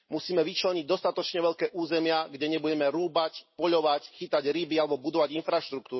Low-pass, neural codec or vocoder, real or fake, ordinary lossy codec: 7.2 kHz; none; real; MP3, 24 kbps